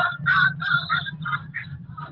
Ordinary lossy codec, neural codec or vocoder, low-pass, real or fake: Opus, 16 kbps; none; 5.4 kHz; real